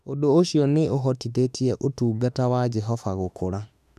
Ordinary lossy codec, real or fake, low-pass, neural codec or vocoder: none; fake; 14.4 kHz; autoencoder, 48 kHz, 32 numbers a frame, DAC-VAE, trained on Japanese speech